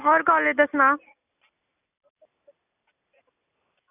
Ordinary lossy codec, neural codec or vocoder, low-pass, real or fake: none; none; 3.6 kHz; real